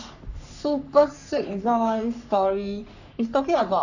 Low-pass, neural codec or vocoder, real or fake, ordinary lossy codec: 7.2 kHz; codec, 44.1 kHz, 3.4 kbps, Pupu-Codec; fake; none